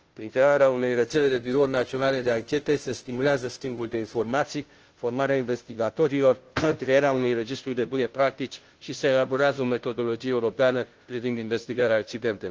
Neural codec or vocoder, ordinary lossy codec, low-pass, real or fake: codec, 16 kHz, 0.5 kbps, FunCodec, trained on Chinese and English, 25 frames a second; Opus, 16 kbps; 7.2 kHz; fake